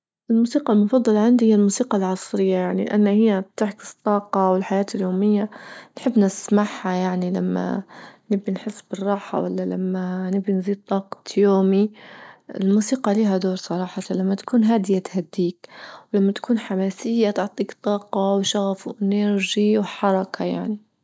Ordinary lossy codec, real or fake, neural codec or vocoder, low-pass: none; real; none; none